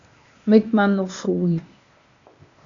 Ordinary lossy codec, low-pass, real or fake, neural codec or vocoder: AAC, 64 kbps; 7.2 kHz; fake; codec, 16 kHz, 0.8 kbps, ZipCodec